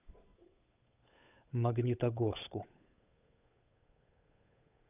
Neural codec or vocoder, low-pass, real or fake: codec, 16 kHz, 8 kbps, FunCodec, trained on Chinese and English, 25 frames a second; 3.6 kHz; fake